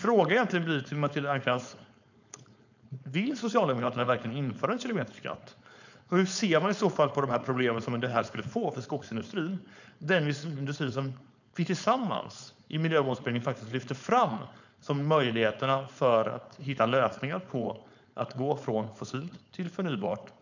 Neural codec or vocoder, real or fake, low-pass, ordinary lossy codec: codec, 16 kHz, 4.8 kbps, FACodec; fake; 7.2 kHz; none